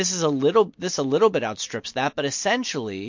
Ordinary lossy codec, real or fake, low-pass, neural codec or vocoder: MP3, 48 kbps; real; 7.2 kHz; none